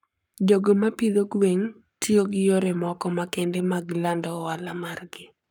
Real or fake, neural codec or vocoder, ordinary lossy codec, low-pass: fake; codec, 44.1 kHz, 7.8 kbps, Pupu-Codec; none; 19.8 kHz